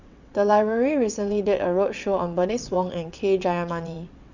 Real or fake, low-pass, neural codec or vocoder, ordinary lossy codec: fake; 7.2 kHz; vocoder, 22.05 kHz, 80 mel bands, WaveNeXt; none